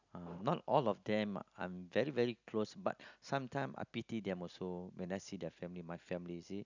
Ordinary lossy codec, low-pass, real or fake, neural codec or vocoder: none; 7.2 kHz; real; none